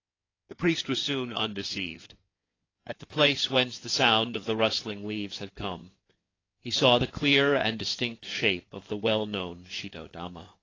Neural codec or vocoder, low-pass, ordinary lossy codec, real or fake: codec, 16 kHz in and 24 kHz out, 2.2 kbps, FireRedTTS-2 codec; 7.2 kHz; AAC, 32 kbps; fake